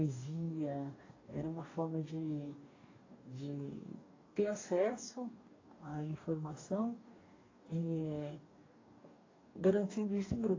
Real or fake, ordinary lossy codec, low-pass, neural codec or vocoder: fake; AAC, 32 kbps; 7.2 kHz; codec, 44.1 kHz, 2.6 kbps, DAC